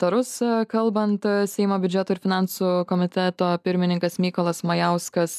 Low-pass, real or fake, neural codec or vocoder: 14.4 kHz; real; none